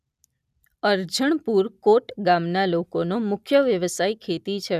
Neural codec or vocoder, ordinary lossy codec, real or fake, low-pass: none; none; real; 14.4 kHz